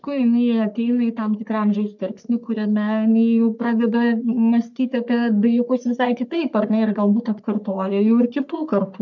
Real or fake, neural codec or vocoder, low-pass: fake; codec, 44.1 kHz, 3.4 kbps, Pupu-Codec; 7.2 kHz